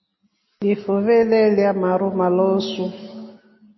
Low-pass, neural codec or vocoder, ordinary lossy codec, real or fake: 7.2 kHz; none; MP3, 24 kbps; real